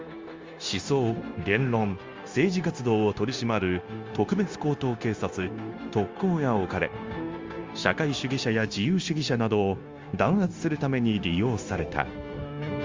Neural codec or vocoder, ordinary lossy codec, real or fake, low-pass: codec, 16 kHz, 0.9 kbps, LongCat-Audio-Codec; Opus, 32 kbps; fake; 7.2 kHz